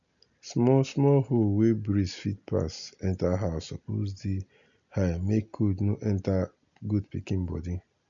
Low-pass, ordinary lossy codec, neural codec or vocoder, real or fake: 7.2 kHz; none; none; real